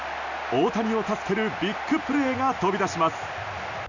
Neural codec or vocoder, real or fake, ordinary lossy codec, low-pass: none; real; none; 7.2 kHz